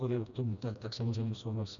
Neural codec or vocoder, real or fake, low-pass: codec, 16 kHz, 1 kbps, FreqCodec, smaller model; fake; 7.2 kHz